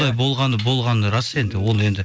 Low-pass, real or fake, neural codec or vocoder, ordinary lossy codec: none; real; none; none